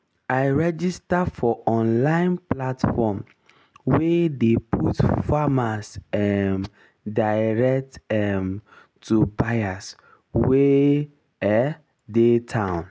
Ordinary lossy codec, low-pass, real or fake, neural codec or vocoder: none; none; real; none